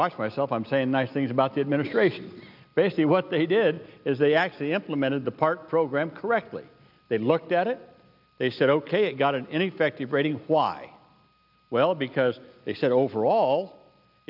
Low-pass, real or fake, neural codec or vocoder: 5.4 kHz; real; none